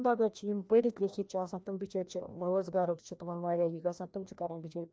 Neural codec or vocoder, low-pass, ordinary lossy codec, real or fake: codec, 16 kHz, 1 kbps, FreqCodec, larger model; none; none; fake